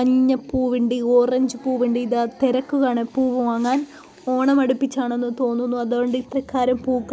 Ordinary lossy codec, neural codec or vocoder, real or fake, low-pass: none; none; real; none